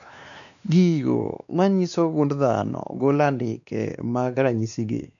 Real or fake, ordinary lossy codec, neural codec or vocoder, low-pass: fake; none; codec, 16 kHz, 2 kbps, X-Codec, WavLM features, trained on Multilingual LibriSpeech; 7.2 kHz